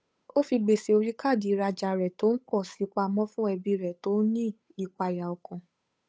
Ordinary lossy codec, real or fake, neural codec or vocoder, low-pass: none; fake; codec, 16 kHz, 8 kbps, FunCodec, trained on Chinese and English, 25 frames a second; none